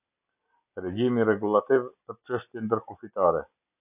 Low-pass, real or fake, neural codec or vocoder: 3.6 kHz; real; none